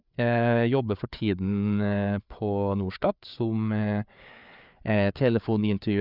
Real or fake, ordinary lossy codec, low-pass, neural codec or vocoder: fake; none; 5.4 kHz; codec, 16 kHz, 4 kbps, FreqCodec, larger model